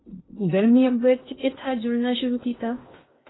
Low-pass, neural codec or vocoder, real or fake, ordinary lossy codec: 7.2 kHz; codec, 16 kHz in and 24 kHz out, 0.6 kbps, FocalCodec, streaming, 2048 codes; fake; AAC, 16 kbps